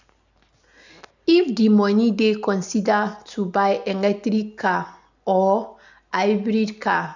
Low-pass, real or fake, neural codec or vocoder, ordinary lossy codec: 7.2 kHz; real; none; none